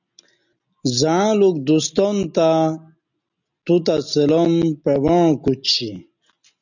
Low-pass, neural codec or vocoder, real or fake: 7.2 kHz; none; real